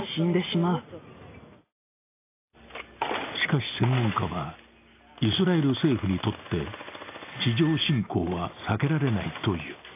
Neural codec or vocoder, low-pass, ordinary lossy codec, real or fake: none; 3.6 kHz; AAC, 24 kbps; real